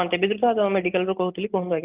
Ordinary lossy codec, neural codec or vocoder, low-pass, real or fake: Opus, 32 kbps; none; 3.6 kHz; real